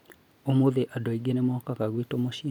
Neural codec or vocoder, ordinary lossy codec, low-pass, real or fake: none; none; 19.8 kHz; real